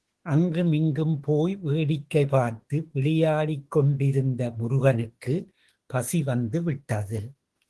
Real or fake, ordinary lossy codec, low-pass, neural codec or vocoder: fake; Opus, 16 kbps; 10.8 kHz; autoencoder, 48 kHz, 32 numbers a frame, DAC-VAE, trained on Japanese speech